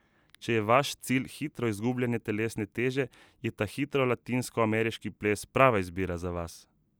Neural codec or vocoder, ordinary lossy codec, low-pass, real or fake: none; none; none; real